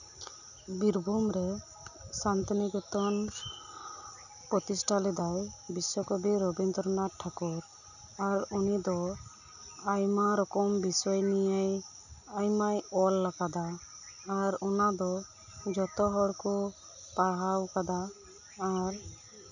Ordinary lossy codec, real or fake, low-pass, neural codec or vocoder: none; real; 7.2 kHz; none